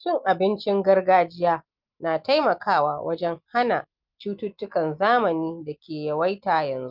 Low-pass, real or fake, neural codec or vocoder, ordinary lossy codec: 5.4 kHz; real; none; Opus, 24 kbps